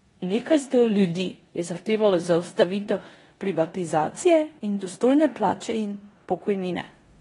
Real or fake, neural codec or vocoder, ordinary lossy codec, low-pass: fake; codec, 16 kHz in and 24 kHz out, 0.9 kbps, LongCat-Audio-Codec, four codebook decoder; AAC, 32 kbps; 10.8 kHz